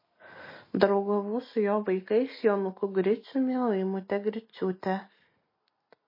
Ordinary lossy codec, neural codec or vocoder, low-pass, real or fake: MP3, 24 kbps; none; 5.4 kHz; real